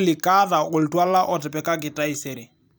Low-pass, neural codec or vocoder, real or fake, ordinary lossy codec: none; vocoder, 44.1 kHz, 128 mel bands every 256 samples, BigVGAN v2; fake; none